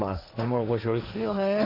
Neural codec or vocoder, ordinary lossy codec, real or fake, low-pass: codec, 16 kHz, 1.1 kbps, Voila-Tokenizer; MP3, 48 kbps; fake; 5.4 kHz